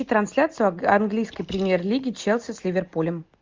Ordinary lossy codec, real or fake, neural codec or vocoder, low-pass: Opus, 24 kbps; real; none; 7.2 kHz